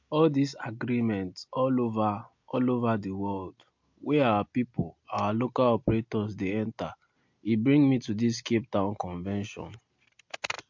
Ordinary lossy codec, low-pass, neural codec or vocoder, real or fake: MP3, 48 kbps; 7.2 kHz; none; real